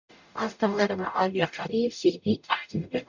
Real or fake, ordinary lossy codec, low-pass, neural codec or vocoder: fake; none; 7.2 kHz; codec, 44.1 kHz, 0.9 kbps, DAC